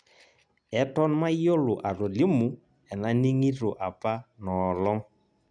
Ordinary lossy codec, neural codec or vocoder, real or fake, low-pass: none; none; real; 9.9 kHz